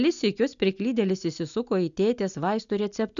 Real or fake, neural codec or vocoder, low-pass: real; none; 7.2 kHz